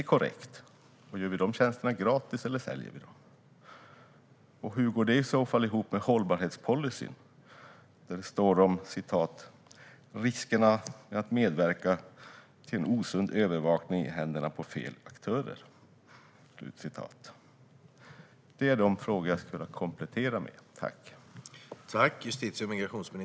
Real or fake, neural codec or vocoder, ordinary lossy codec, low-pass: real; none; none; none